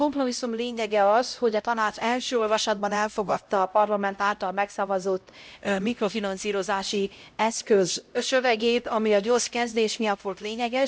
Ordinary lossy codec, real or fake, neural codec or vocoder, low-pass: none; fake; codec, 16 kHz, 0.5 kbps, X-Codec, HuBERT features, trained on LibriSpeech; none